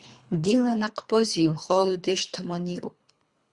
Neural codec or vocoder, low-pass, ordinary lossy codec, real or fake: codec, 24 kHz, 3 kbps, HILCodec; 10.8 kHz; Opus, 64 kbps; fake